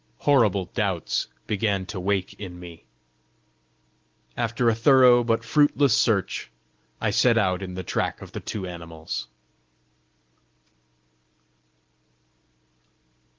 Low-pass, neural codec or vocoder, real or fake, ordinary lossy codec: 7.2 kHz; none; real; Opus, 16 kbps